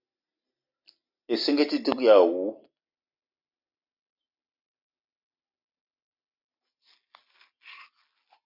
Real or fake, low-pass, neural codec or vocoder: real; 5.4 kHz; none